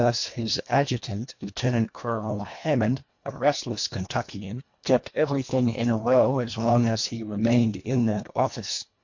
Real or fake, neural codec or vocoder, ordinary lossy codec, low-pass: fake; codec, 24 kHz, 1.5 kbps, HILCodec; MP3, 48 kbps; 7.2 kHz